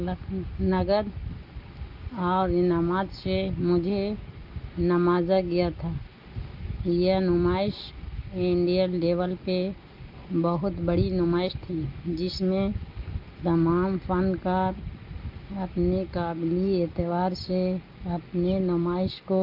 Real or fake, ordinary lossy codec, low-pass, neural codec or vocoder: real; Opus, 32 kbps; 5.4 kHz; none